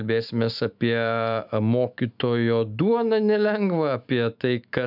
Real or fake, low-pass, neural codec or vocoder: fake; 5.4 kHz; autoencoder, 48 kHz, 128 numbers a frame, DAC-VAE, trained on Japanese speech